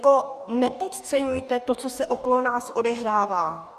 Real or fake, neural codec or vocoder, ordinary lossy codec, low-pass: fake; codec, 44.1 kHz, 2.6 kbps, DAC; Opus, 64 kbps; 14.4 kHz